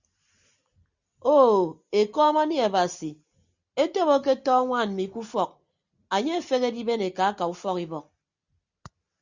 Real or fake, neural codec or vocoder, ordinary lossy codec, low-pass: real; none; Opus, 64 kbps; 7.2 kHz